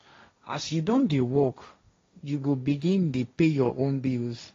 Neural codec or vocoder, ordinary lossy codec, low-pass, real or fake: codec, 16 kHz, 1.1 kbps, Voila-Tokenizer; AAC, 32 kbps; 7.2 kHz; fake